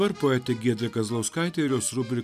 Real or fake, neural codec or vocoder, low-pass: real; none; 14.4 kHz